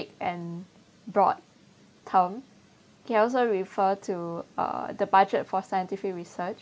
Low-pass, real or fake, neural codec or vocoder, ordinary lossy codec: none; real; none; none